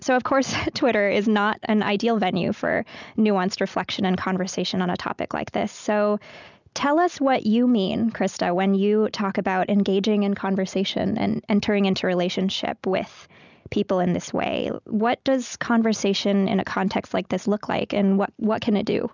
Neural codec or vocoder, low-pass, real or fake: none; 7.2 kHz; real